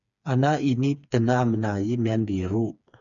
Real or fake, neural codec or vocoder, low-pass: fake; codec, 16 kHz, 4 kbps, FreqCodec, smaller model; 7.2 kHz